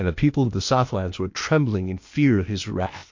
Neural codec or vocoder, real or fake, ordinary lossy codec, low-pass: codec, 16 kHz, 0.8 kbps, ZipCodec; fake; MP3, 48 kbps; 7.2 kHz